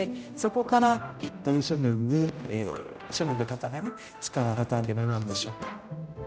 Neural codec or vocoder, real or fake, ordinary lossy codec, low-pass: codec, 16 kHz, 0.5 kbps, X-Codec, HuBERT features, trained on general audio; fake; none; none